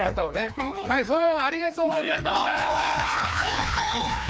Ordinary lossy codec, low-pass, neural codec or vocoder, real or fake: none; none; codec, 16 kHz, 2 kbps, FreqCodec, larger model; fake